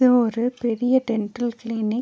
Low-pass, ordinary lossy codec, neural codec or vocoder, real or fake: none; none; none; real